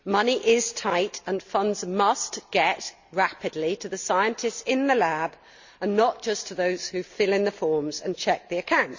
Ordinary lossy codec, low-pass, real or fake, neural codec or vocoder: Opus, 64 kbps; 7.2 kHz; real; none